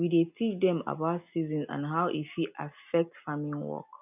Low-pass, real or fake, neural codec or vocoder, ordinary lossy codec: 3.6 kHz; real; none; none